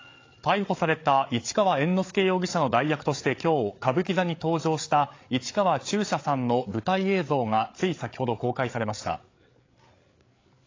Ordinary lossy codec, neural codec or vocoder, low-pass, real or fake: AAC, 32 kbps; codec, 16 kHz, 8 kbps, FreqCodec, larger model; 7.2 kHz; fake